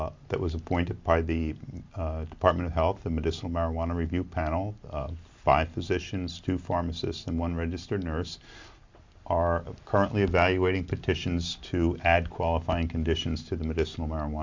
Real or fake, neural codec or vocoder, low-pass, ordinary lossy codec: real; none; 7.2 kHz; MP3, 64 kbps